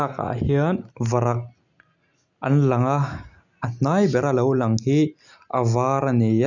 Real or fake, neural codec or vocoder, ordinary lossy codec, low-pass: real; none; none; 7.2 kHz